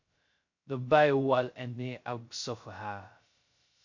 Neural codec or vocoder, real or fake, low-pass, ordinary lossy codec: codec, 16 kHz, 0.2 kbps, FocalCodec; fake; 7.2 kHz; MP3, 48 kbps